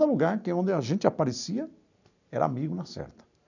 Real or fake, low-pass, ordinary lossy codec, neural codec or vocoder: real; 7.2 kHz; none; none